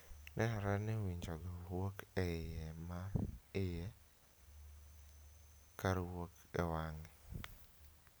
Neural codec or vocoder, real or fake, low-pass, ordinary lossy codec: none; real; none; none